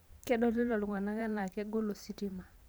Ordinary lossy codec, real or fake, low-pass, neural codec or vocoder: none; fake; none; vocoder, 44.1 kHz, 128 mel bands every 512 samples, BigVGAN v2